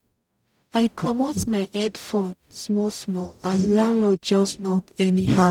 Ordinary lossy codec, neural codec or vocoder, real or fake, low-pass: none; codec, 44.1 kHz, 0.9 kbps, DAC; fake; 19.8 kHz